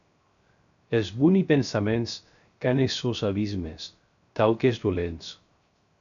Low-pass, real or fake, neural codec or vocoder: 7.2 kHz; fake; codec, 16 kHz, 0.3 kbps, FocalCodec